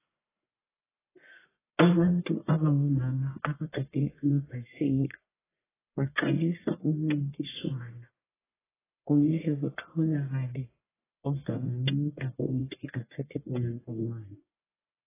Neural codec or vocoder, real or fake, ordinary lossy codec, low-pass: codec, 44.1 kHz, 1.7 kbps, Pupu-Codec; fake; AAC, 16 kbps; 3.6 kHz